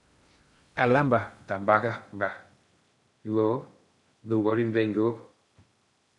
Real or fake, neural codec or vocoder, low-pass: fake; codec, 16 kHz in and 24 kHz out, 0.6 kbps, FocalCodec, streaming, 2048 codes; 10.8 kHz